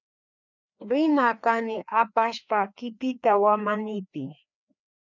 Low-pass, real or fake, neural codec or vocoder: 7.2 kHz; fake; codec, 16 kHz, 2 kbps, FreqCodec, larger model